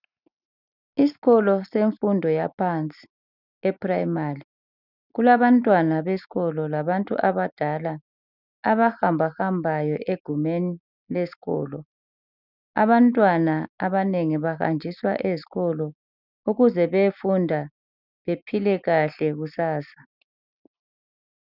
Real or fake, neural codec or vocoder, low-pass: real; none; 5.4 kHz